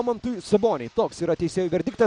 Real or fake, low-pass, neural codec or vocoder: real; 9.9 kHz; none